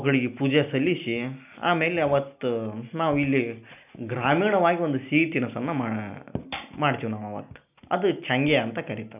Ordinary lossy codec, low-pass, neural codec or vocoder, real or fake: none; 3.6 kHz; none; real